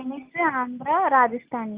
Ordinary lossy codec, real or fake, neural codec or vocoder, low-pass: Opus, 24 kbps; real; none; 3.6 kHz